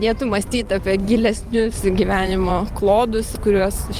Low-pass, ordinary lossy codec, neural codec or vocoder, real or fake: 14.4 kHz; Opus, 32 kbps; vocoder, 44.1 kHz, 128 mel bands every 512 samples, BigVGAN v2; fake